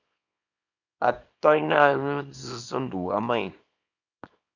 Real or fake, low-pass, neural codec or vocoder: fake; 7.2 kHz; codec, 24 kHz, 0.9 kbps, WavTokenizer, small release